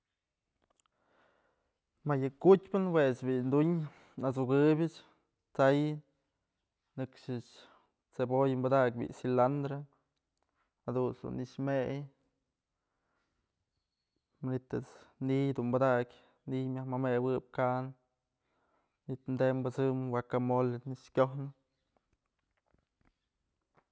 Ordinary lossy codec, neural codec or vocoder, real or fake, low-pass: none; none; real; none